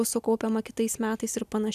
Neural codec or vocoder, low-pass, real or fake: none; 14.4 kHz; real